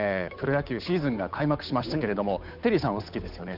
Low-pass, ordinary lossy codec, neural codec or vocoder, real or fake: 5.4 kHz; none; codec, 16 kHz, 8 kbps, FunCodec, trained on Chinese and English, 25 frames a second; fake